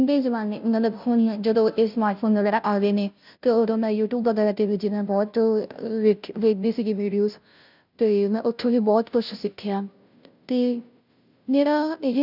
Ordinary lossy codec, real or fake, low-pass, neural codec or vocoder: none; fake; 5.4 kHz; codec, 16 kHz, 0.5 kbps, FunCodec, trained on Chinese and English, 25 frames a second